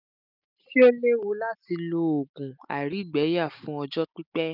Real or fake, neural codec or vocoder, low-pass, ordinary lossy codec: real; none; 5.4 kHz; none